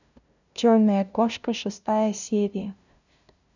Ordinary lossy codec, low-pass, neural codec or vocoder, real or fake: none; 7.2 kHz; codec, 16 kHz, 0.5 kbps, FunCodec, trained on LibriTTS, 25 frames a second; fake